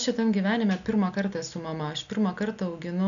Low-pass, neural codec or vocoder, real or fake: 7.2 kHz; none; real